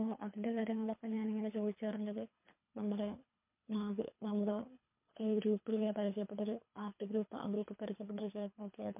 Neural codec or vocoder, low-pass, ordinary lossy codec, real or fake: codec, 24 kHz, 3 kbps, HILCodec; 3.6 kHz; MP3, 32 kbps; fake